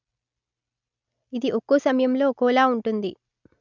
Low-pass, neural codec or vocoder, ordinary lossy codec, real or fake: 7.2 kHz; none; none; real